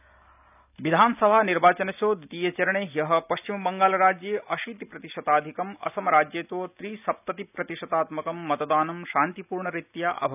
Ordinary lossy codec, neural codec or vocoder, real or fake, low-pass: none; none; real; 3.6 kHz